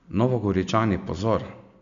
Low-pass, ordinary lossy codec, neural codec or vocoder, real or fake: 7.2 kHz; none; none; real